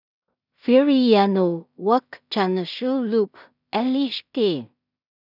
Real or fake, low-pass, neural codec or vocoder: fake; 5.4 kHz; codec, 16 kHz in and 24 kHz out, 0.4 kbps, LongCat-Audio-Codec, two codebook decoder